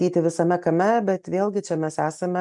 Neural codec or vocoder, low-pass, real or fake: none; 10.8 kHz; real